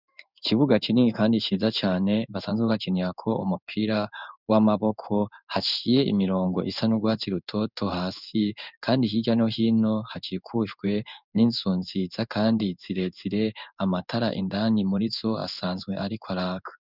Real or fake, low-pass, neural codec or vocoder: fake; 5.4 kHz; codec, 16 kHz in and 24 kHz out, 1 kbps, XY-Tokenizer